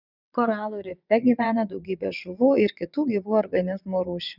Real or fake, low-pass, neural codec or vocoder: fake; 5.4 kHz; vocoder, 22.05 kHz, 80 mel bands, WaveNeXt